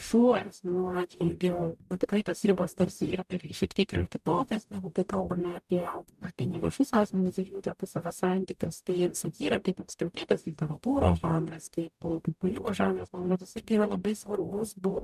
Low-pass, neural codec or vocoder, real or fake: 14.4 kHz; codec, 44.1 kHz, 0.9 kbps, DAC; fake